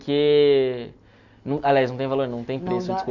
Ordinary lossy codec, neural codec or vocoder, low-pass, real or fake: none; none; 7.2 kHz; real